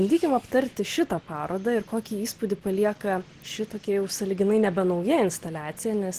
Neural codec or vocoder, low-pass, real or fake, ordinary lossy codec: none; 14.4 kHz; real; Opus, 16 kbps